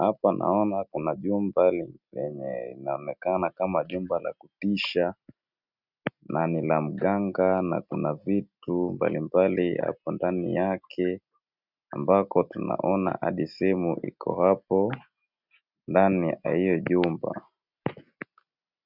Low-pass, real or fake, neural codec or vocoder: 5.4 kHz; real; none